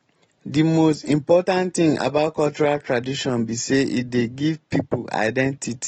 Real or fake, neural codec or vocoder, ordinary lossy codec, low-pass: real; none; AAC, 24 kbps; 10.8 kHz